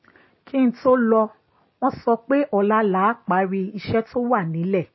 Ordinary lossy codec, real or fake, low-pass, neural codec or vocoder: MP3, 24 kbps; real; 7.2 kHz; none